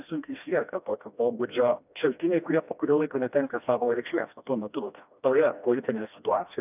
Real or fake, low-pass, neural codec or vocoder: fake; 3.6 kHz; codec, 16 kHz, 1 kbps, FreqCodec, smaller model